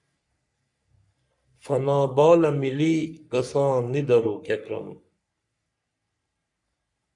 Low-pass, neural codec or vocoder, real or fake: 10.8 kHz; codec, 44.1 kHz, 3.4 kbps, Pupu-Codec; fake